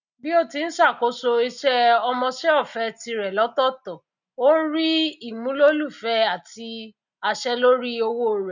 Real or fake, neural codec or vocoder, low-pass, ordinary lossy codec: real; none; 7.2 kHz; none